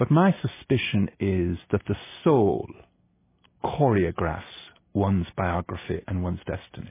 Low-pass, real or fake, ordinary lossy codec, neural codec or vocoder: 3.6 kHz; real; MP3, 16 kbps; none